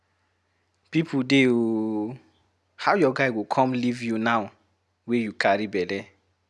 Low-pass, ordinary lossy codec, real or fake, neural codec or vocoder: none; none; real; none